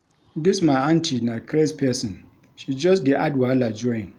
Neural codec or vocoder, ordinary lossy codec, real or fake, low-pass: none; Opus, 32 kbps; real; 19.8 kHz